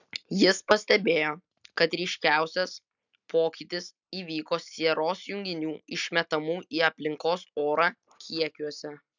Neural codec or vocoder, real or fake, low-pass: none; real; 7.2 kHz